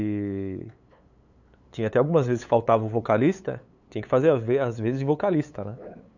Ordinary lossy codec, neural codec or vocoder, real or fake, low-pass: none; codec, 16 kHz, 8 kbps, FunCodec, trained on LibriTTS, 25 frames a second; fake; 7.2 kHz